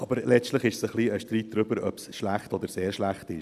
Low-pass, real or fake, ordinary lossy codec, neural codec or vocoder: 14.4 kHz; real; none; none